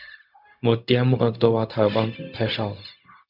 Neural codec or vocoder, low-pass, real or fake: codec, 16 kHz, 0.4 kbps, LongCat-Audio-Codec; 5.4 kHz; fake